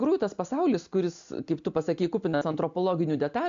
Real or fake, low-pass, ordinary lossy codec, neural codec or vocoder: real; 7.2 kHz; AAC, 64 kbps; none